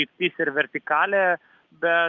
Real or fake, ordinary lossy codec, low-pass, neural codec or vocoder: real; Opus, 24 kbps; 7.2 kHz; none